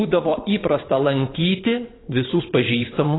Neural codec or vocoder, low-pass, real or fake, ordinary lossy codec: vocoder, 22.05 kHz, 80 mel bands, WaveNeXt; 7.2 kHz; fake; AAC, 16 kbps